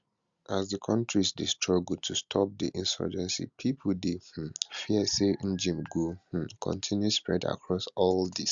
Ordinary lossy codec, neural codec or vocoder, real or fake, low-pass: Opus, 64 kbps; none; real; 7.2 kHz